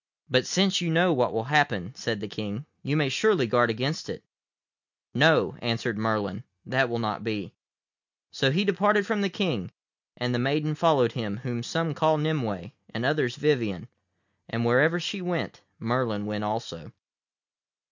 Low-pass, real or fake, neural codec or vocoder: 7.2 kHz; real; none